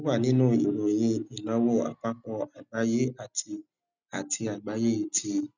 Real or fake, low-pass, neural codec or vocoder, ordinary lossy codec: real; 7.2 kHz; none; none